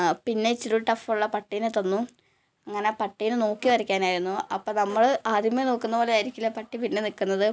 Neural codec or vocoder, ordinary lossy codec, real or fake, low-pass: none; none; real; none